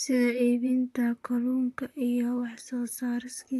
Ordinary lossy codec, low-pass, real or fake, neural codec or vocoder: AAC, 64 kbps; 14.4 kHz; fake; vocoder, 44.1 kHz, 128 mel bands, Pupu-Vocoder